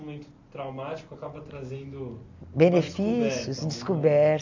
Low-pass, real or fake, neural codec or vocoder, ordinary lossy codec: 7.2 kHz; real; none; AAC, 48 kbps